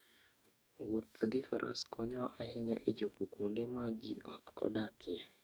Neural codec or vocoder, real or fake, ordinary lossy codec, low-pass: codec, 44.1 kHz, 2.6 kbps, DAC; fake; none; none